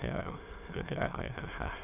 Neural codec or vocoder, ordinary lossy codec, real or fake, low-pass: autoencoder, 22.05 kHz, a latent of 192 numbers a frame, VITS, trained on many speakers; none; fake; 3.6 kHz